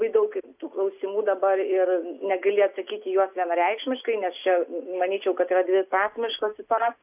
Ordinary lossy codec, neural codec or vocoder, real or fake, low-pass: AAC, 32 kbps; none; real; 3.6 kHz